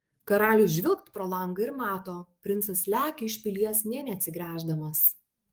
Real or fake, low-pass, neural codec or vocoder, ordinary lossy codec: fake; 19.8 kHz; codec, 44.1 kHz, 7.8 kbps, DAC; Opus, 32 kbps